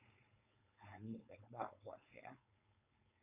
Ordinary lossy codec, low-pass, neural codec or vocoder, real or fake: MP3, 32 kbps; 3.6 kHz; codec, 16 kHz, 4.8 kbps, FACodec; fake